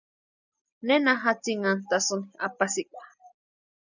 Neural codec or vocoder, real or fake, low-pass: none; real; 7.2 kHz